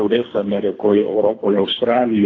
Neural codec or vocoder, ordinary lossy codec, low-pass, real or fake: codec, 24 kHz, 3 kbps, HILCodec; AAC, 32 kbps; 7.2 kHz; fake